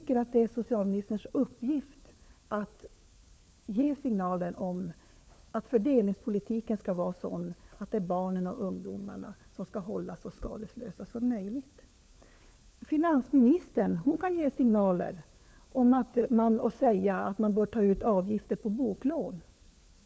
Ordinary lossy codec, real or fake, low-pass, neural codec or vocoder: none; fake; none; codec, 16 kHz, 4 kbps, FunCodec, trained on LibriTTS, 50 frames a second